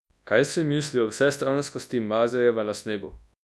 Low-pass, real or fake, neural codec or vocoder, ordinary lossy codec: none; fake; codec, 24 kHz, 0.9 kbps, WavTokenizer, large speech release; none